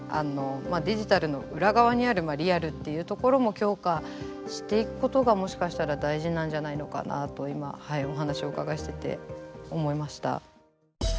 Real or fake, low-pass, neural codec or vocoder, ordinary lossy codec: real; none; none; none